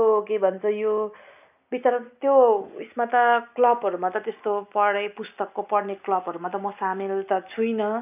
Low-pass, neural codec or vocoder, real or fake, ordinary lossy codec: 3.6 kHz; none; real; none